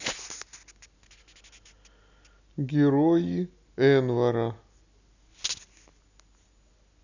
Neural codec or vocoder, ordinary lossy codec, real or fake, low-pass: none; none; real; 7.2 kHz